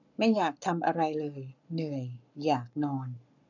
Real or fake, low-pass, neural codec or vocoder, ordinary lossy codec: fake; 7.2 kHz; codec, 44.1 kHz, 7.8 kbps, Pupu-Codec; none